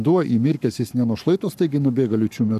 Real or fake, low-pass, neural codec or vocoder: fake; 14.4 kHz; codec, 44.1 kHz, 7.8 kbps, Pupu-Codec